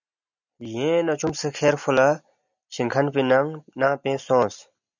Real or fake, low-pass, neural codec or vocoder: real; 7.2 kHz; none